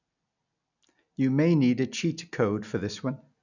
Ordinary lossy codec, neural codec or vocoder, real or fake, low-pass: none; none; real; 7.2 kHz